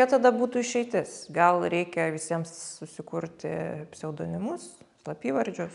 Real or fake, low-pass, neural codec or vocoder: real; 10.8 kHz; none